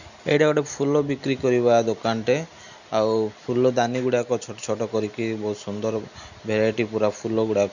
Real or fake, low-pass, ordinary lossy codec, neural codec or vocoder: real; 7.2 kHz; none; none